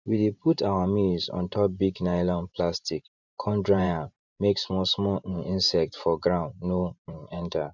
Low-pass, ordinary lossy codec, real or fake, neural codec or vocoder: 7.2 kHz; none; fake; vocoder, 44.1 kHz, 128 mel bands every 512 samples, BigVGAN v2